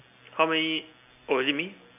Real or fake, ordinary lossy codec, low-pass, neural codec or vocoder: real; none; 3.6 kHz; none